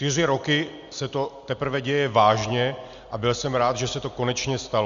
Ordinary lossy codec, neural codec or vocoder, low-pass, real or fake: Opus, 64 kbps; none; 7.2 kHz; real